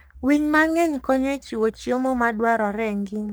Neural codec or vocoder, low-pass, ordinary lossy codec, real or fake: codec, 44.1 kHz, 3.4 kbps, Pupu-Codec; none; none; fake